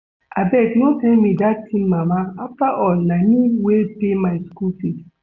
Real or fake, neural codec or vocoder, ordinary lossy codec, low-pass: real; none; none; 7.2 kHz